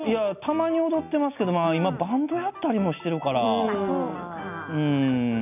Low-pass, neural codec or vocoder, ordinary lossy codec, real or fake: 3.6 kHz; none; none; real